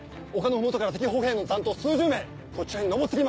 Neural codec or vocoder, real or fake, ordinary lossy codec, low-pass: none; real; none; none